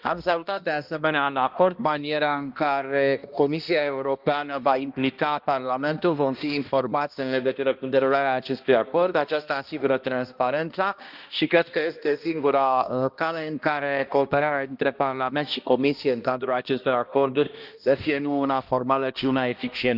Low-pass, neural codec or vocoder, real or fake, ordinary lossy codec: 5.4 kHz; codec, 16 kHz, 1 kbps, X-Codec, HuBERT features, trained on balanced general audio; fake; Opus, 24 kbps